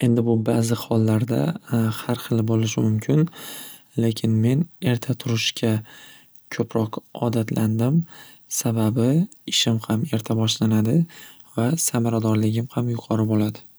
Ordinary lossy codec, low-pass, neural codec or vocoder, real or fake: none; none; none; real